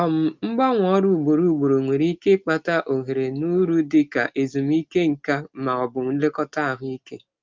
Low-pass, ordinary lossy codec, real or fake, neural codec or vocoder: 7.2 kHz; Opus, 24 kbps; real; none